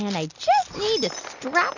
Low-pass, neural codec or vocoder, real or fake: 7.2 kHz; none; real